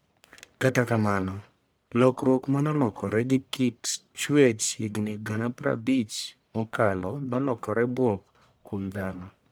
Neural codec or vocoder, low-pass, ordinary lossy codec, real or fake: codec, 44.1 kHz, 1.7 kbps, Pupu-Codec; none; none; fake